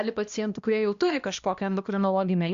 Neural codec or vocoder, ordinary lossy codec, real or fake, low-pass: codec, 16 kHz, 1 kbps, X-Codec, HuBERT features, trained on balanced general audio; Opus, 64 kbps; fake; 7.2 kHz